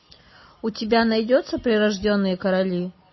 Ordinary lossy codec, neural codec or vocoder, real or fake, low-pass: MP3, 24 kbps; none; real; 7.2 kHz